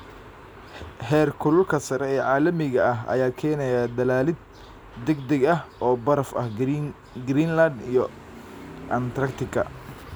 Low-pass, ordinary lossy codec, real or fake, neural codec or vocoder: none; none; real; none